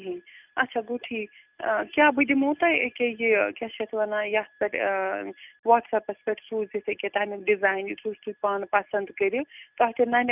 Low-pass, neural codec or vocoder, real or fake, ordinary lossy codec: 3.6 kHz; none; real; none